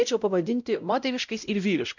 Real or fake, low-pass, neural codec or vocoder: fake; 7.2 kHz; codec, 16 kHz, 0.5 kbps, X-Codec, WavLM features, trained on Multilingual LibriSpeech